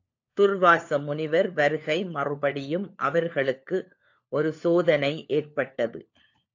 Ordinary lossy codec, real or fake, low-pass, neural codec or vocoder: AAC, 48 kbps; fake; 7.2 kHz; codec, 16 kHz, 4 kbps, FunCodec, trained on LibriTTS, 50 frames a second